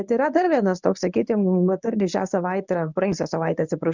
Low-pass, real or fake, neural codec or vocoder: 7.2 kHz; fake; codec, 24 kHz, 0.9 kbps, WavTokenizer, medium speech release version 1